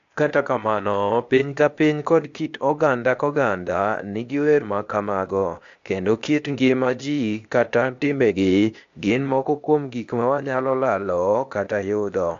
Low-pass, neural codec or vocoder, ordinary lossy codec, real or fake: 7.2 kHz; codec, 16 kHz, 0.8 kbps, ZipCodec; AAC, 64 kbps; fake